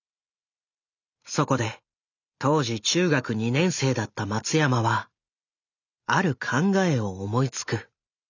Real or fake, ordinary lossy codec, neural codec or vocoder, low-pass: real; none; none; 7.2 kHz